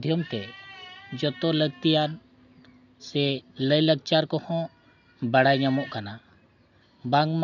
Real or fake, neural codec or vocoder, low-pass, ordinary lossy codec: real; none; 7.2 kHz; none